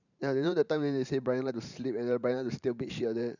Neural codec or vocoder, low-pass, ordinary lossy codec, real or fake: vocoder, 44.1 kHz, 128 mel bands every 512 samples, BigVGAN v2; 7.2 kHz; none; fake